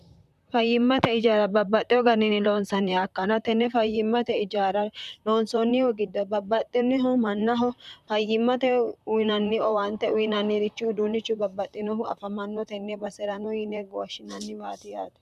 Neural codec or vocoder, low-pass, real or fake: vocoder, 44.1 kHz, 128 mel bands, Pupu-Vocoder; 14.4 kHz; fake